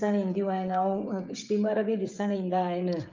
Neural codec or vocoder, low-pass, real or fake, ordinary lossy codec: codec, 16 kHz, 16 kbps, FreqCodec, smaller model; 7.2 kHz; fake; Opus, 24 kbps